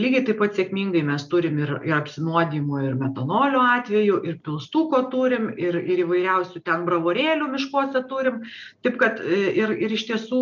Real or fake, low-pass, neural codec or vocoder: real; 7.2 kHz; none